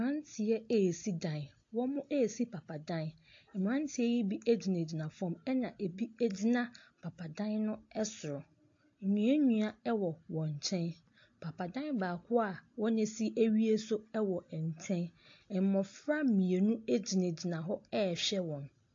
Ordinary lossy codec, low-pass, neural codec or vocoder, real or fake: AAC, 48 kbps; 7.2 kHz; none; real